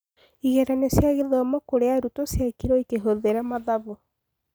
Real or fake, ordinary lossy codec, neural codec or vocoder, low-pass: real; none; none; none